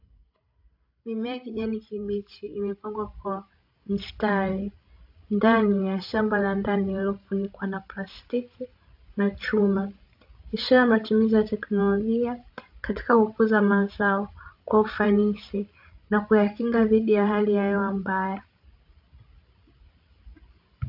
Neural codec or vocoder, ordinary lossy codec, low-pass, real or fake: codec, 16 kHz, 16 kbps, FreqCodec, larger model; AAC, 48 kbps; 5.4 kHz; fake